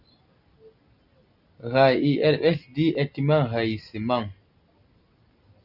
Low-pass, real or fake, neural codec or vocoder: 5.4 kHz; real; none